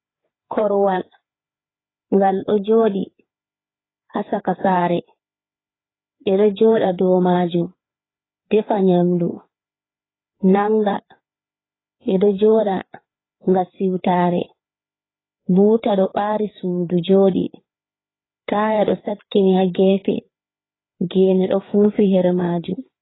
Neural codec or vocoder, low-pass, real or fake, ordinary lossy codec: codec, 16 kHz, 4 kbps, FreqCodec, larger model; 7.2 kHz; fake; AAC, 16 kbps